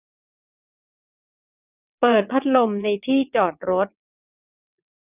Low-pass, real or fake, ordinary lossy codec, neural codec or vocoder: 3.6 kHz; fake; none; vocoder, 22.05 kHz, 80 mel bands, WaveNeXt